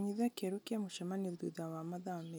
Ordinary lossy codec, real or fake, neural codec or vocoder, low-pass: none; real; none; none